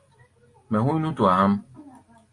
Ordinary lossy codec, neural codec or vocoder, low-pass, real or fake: AAC, 48 kbps; none; 10.8 kHz; real